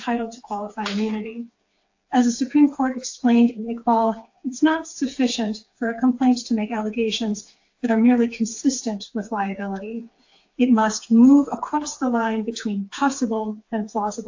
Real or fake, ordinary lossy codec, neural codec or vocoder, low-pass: fake; AAC, 48 kbps; codec, 16 kHz, 4 kbps, FreqCodec, smaller model; 7.2 kHz